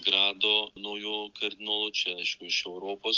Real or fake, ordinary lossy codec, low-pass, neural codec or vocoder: real; Opus, 32 kbps; 7.2 kHz; none